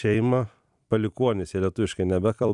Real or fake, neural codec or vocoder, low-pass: fake; vocoder, 44.1 kHz, 128 mel bands every 256 samples, BigVGAN v2; 10.8 kHz